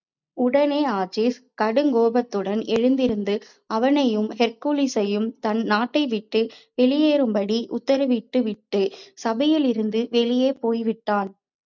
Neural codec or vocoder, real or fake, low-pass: none; real; 7.2 kHz